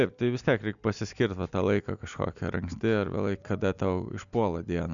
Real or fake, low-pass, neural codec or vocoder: real; 7.2 kHz; none